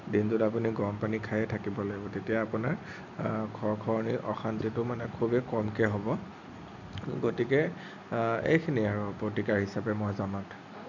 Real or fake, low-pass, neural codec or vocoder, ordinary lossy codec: real; 7.2 kHz; none; none